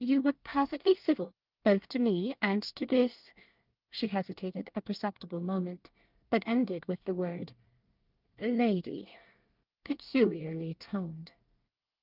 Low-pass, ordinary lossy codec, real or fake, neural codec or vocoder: 5.4 kHz; Opus, 24 kbps; fake; codec, 24 kHz, 1 kbps, SNAC